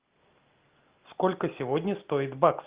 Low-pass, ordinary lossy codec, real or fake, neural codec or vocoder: 3.6 kHz; Opus, 24 kbps; real; none